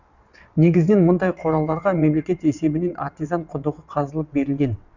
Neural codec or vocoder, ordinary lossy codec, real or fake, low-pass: vocoder, 22.05 kHz, 80 mel bands, WaveNeXt; none; fake; 7.2 kHz